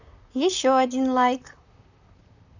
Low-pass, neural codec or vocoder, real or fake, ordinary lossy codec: 7.2 kHz; vocoder, 44.1 kHz, 128 mel bands, Pupu-Vocoder; fake; none